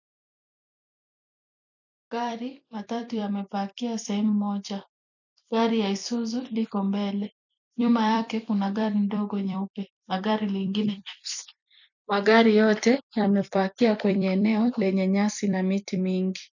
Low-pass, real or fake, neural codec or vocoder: 7.2 kHz; fake; vocoder, 44.1 kHz, 128 mel bands every 256 samples, BigVGAN v2